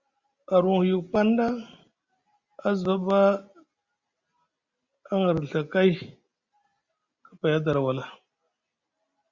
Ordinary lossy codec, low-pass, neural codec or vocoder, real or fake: Opus, 64 kbps; 7.2 kHz; none; real